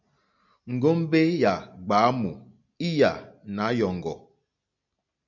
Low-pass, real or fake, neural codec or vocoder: 7.2 kHz; real; none